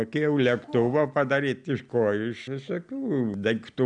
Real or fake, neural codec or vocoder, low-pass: real; none; 9.9 kHz